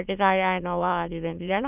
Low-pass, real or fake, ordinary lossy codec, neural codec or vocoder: 3.6 kHz; fake; none; autoencoder, 22.05 kHz, a latent of 192 numbers a frame, VITS, trained on many speakers